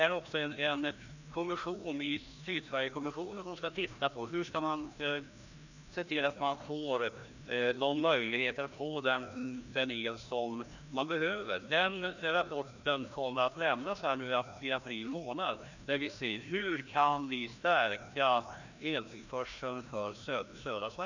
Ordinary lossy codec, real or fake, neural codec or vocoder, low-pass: none; fake; codec, 16 kHz, 1 kbps, FreqCodec, larger model; 7.2 kHz